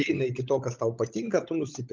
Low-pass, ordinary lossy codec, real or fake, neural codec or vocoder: 7.2 kHz; Opus, 32 kbps; fake; codec, 16 kHz, 16 kbps, FunCodec, trained on LibriTTS, 50 frames a second